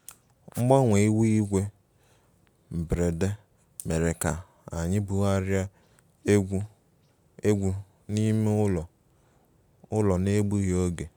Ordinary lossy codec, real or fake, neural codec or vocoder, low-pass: none; real; none; none